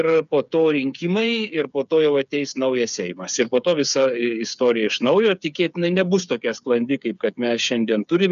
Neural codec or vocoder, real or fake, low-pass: codec, 16 kHz, 8 kbps, FreqCodec, smaller model; fake; 7.2 kHz